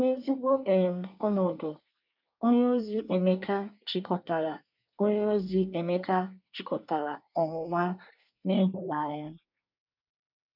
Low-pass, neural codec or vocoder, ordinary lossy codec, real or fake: 5.4 kHz; codec, 24 kHz, 1 kbps, SNAC; none; fake